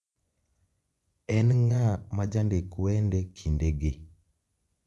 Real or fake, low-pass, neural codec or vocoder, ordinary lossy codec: fake; none; vocoder, 24 kHz, 100 mel bands, Vocos; none